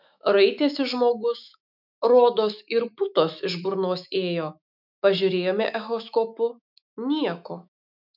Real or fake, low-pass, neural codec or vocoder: fake; 5.4 kHz; autoencoder, 48 kHz, 128 numbers a frame, DAC-VAE, trained on Japanese speech